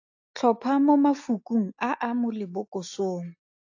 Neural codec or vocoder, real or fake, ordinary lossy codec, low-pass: none; real; AAC, 48 kbps; 7.2 kHz